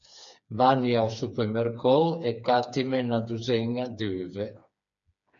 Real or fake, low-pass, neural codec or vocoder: fake; 7.2 kHz; codec, 16 kHz, 4 kbps, FreqCodec, smaller model